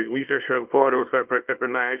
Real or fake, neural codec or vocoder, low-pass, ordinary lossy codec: fake; codec, 24 kHz, 0.9 kbps, WavTokenizer, small release; 3.6 kHz; Opus, 32 kbps